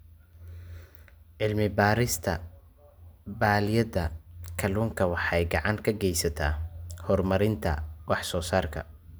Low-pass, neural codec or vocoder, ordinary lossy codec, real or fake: none; none; none; real